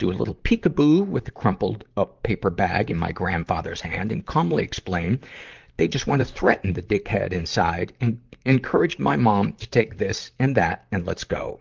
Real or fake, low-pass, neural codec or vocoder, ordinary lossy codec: fake; 7.2 kHz; vocoder, 44.1 kHz, 128 mel bands, Pupu-Vocoder; Opus, 32 kbps